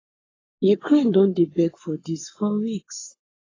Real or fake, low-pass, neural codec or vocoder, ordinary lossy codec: fake; 7.2 kHz; codec, 44.1 kHz, 7.8 kbps, Pupu-Codec; AAC, 32 kbps